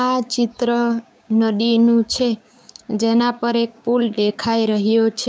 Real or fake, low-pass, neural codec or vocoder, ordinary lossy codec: fake; none; codec, 16 kHz, 6 kbps, DAC; none